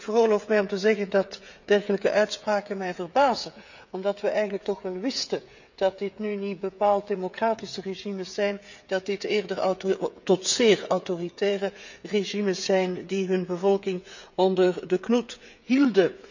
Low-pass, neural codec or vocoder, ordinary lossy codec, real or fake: 7.2 kHz; codec, 16 kHz, 16 kbps, FreqCodec, smaller model; none; fake